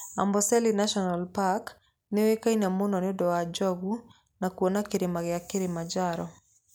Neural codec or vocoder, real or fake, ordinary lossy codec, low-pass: none; real; none; none